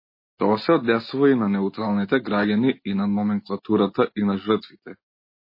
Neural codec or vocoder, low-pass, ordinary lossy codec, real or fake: none; 5.4 kHz; MP3, 24 kbps; real